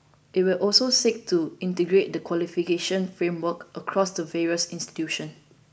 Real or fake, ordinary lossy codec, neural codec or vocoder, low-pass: real; none; none; none